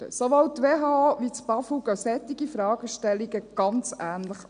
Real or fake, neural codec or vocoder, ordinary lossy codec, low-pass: real; none; none; 9.9 kHz